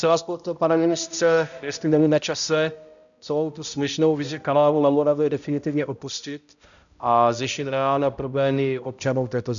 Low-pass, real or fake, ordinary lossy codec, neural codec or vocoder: 7.2 kHz; fake; MP3, 96 kbps; codec, 16 kHz, 0.5 kbps, X-Codec, HuBERT features, trained on balanced general audio